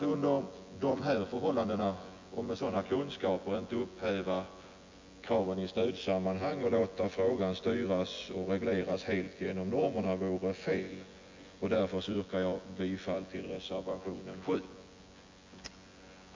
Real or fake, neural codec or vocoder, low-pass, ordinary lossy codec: fake; vocoder, 24 kHz, 100 mel bands, Vocos; 7.2 kHz; MP3, 48 kbps